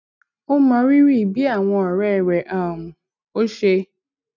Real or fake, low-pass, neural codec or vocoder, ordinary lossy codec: real; 7.2 kHz; none; none